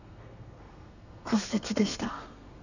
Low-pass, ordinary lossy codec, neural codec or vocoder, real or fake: 7.2 kHz; none; codec, 32 kHz, 1.9 kbps, SNAC; fake